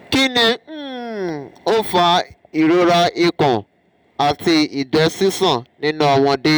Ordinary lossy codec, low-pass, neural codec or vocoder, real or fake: none; none; none; real